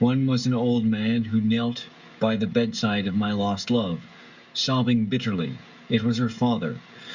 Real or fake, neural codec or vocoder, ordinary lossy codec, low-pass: fake; codec, 16 kHz, 16 kbps, FreqCodec, smaller model; Opus, 64 kbps; 7.2 kHz